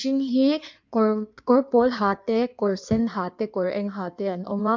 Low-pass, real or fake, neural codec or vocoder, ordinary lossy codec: 7.2 kHz; fake; codec, 16 kHz in and 24 kHz out, 1.1 kbps, FireRedTTS-2 codec; MP3, 64 kbps